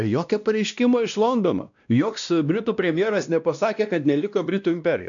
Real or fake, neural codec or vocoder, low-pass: fake; codec, 16 kHz, 1 kbps, X-Codec, WavLM features, trained on Multilingual LibriSpeech; 7.2 kHz